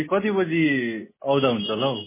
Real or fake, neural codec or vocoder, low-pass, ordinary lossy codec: real; none; 3.6 kHz; MP3, 16 kbps